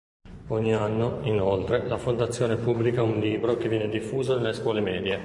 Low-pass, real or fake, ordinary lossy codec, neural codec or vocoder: 10.8 kHz; fake; MP3, 96 kbps; vocoder, 24 kHz, 100 mel bands, Vocos